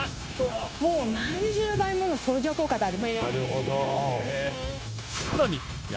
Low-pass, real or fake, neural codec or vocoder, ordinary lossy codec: none; fake; codec, 16 kHz, 0.9 kbps, LongCat-Audio-Codec; none